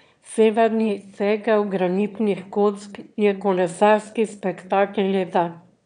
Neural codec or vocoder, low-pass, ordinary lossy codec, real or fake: autoencoder, 22.05 kHz, a latent of 192 numbers a frame, VITS, trained on one speaker; 9.9 kHz; none; fake